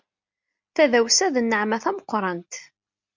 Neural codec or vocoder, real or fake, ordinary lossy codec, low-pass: none; real; MP3, 64 kbps; 7.2 kHz